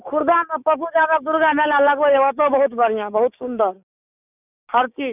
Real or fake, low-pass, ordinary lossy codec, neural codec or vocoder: fake; 3.6 kHz; none; codec, 16 kHz, 6 kbps, DAC